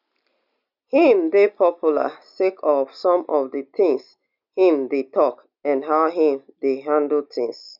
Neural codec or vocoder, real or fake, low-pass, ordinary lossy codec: none; real; 5.4 kHz; none